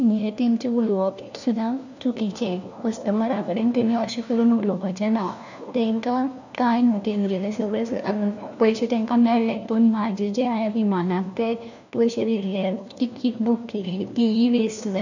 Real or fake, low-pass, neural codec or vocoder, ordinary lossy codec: fake; 7.2 kHz; codec, 16 kHz, 1 kbps, FunCodec, trained on LibriTTS, 50 frames a second; none